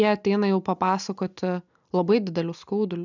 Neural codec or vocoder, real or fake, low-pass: none; real; 7.2 kHz